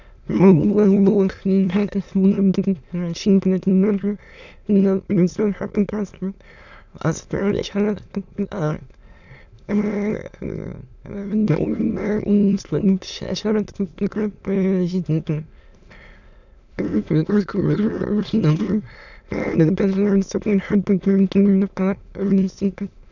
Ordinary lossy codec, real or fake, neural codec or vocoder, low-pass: none; fake; autoencoder, 22.05 kHz, a latent of 192 numbers a frame, VITS, trained on many speakers; 7.2 kHz